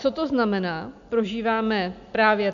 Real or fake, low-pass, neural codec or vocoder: real; 7.2 kHz; none